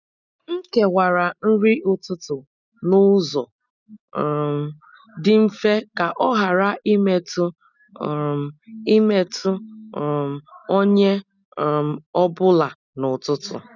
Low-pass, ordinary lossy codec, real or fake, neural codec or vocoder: 7.2 kHz; none; real; none